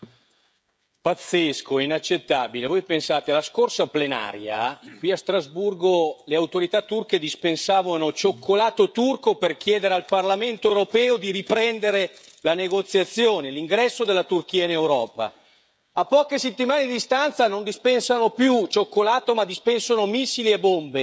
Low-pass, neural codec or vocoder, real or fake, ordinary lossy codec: none; codec, 16 kHz, 16 kbps, FreqCodec, smaller model; fake; none